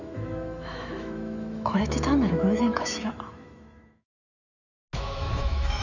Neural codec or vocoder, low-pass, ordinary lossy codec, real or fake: autoencoder, 48 kHz, 128 numbers a frame, DAC-VAE, trained on Japanese speech; 7.2 kHz; none; fake